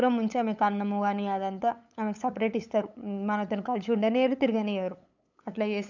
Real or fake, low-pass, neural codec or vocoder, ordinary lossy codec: fake; 7.2 kHz; codec, 16 kHz, 8 kbps, FunCodec, trained on LibriTTS, 25 frames a second; none